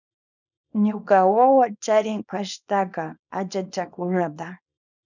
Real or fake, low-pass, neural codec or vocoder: fake; 7.2 kHz; codec, 24 kHz, 0.9 kbps, WavTokenizer, small release